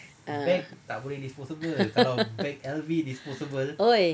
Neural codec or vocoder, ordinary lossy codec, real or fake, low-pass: none; none; real; none